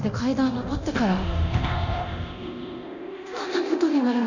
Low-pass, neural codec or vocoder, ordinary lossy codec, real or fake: 7.2 kHz; codec, 24 kHz, 0.9 kbps, DualCodec; none; fake